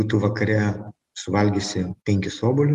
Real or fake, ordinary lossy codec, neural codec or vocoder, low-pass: real; Opus, 24 kbps; none; 14.4 kHz